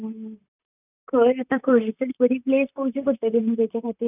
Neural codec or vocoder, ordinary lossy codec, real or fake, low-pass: vocoder, 44.1 kHz, 128 mel bands, Pupu-Vocoder; none; fake; 3.6 kHz